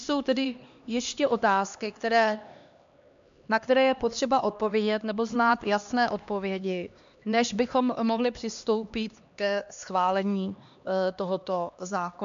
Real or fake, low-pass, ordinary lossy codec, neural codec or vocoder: fake; 7.2 kHz; MP3, 64 kbps; codec, 16 kHz, 2 kbps, X-Codec, HuBERT features, trained on LibriSpeech